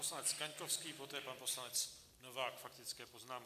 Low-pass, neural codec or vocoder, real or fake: 14.4 kHz; none; real